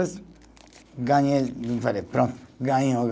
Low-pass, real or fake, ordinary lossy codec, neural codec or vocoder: none; real; none; none